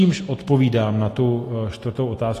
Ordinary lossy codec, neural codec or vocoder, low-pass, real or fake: AAC, 48 kbps; none; 14.4 kHz; real